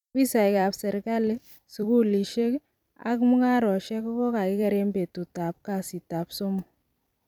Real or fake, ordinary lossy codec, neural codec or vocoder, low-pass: fake; none; vocoder, 44.1 kHz, 128 mel bands every 256 samples, BigVGAN v2; 19.8 kHz